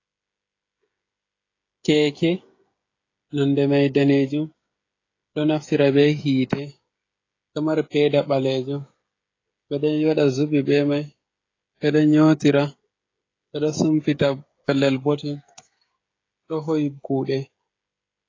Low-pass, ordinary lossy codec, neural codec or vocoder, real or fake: 7.2 kHz; AAC, 32 kbps; codec, 16 kHz, 16 kbps, FreqCodec, smaller model; fake